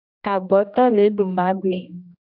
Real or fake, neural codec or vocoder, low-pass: fake; codec, 16 kHz, 1 kbps, X-Codec, HuBERT features, trained on general audio; 5.4 kHz